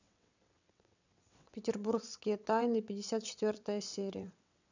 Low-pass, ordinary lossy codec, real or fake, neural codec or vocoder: 7.2 kHz; none; fake; vocoder, 22.05 kHz, 80 mel bands, WaveNeXt